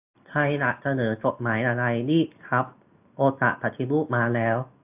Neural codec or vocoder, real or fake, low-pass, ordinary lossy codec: none; real; 3.6 kHz; AAC, 32 kbps